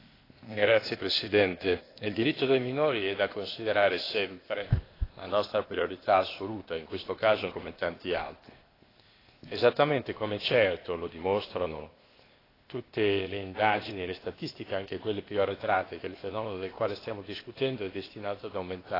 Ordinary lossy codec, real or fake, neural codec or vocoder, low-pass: AAC, 24 kbps; fake; codec, 16 kHz, 0.8 kbps, ZipCodec; 5.4 kHz